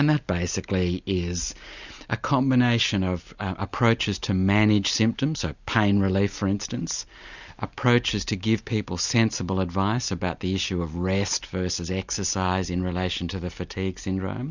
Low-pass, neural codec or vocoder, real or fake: 7.2 kHz; none; real